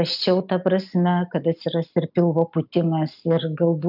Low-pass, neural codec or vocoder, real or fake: 5.4 kHz; none; real